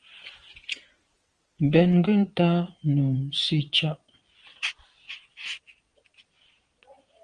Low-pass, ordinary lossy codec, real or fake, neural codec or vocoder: 9.9 kHz; Opus, 32 kbps; fake; vocoder, 22.05 kHz, 80 mel bands, Vocos